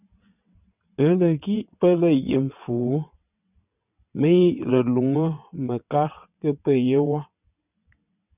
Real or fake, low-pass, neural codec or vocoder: fake; 3.6 kHz; vocoder, 22.05 kHz, 80 mel bands, WaveNeXt